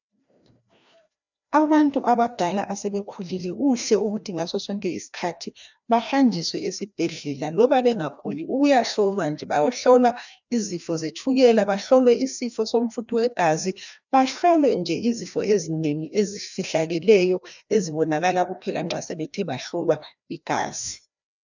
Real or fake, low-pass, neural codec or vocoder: fake; 7.2 kHz; codec, 16 kHz, 1 kbps, FreqCodec, larger model